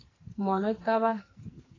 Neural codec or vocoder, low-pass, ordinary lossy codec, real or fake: codec, 44.1 kHz, 2.6 kbps, SNAC; 7.2 kHz; AAC, 32 kbps; fake